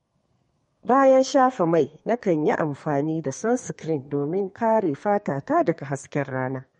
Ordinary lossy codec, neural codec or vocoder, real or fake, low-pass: MP3, 48 kbps; codec, 32 kHz, 1.9 kbps, SNAC; fake; 14.4 kHz